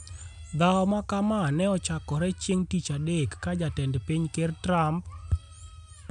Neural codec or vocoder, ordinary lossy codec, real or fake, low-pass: none; none; real; 10.8 kHz